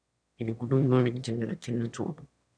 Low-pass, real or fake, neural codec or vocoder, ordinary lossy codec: 9.9 kHz; fake; autoencoder, 22.05 kHz, a latent of 192 numbers a frame, VITS, trained on one speaker; none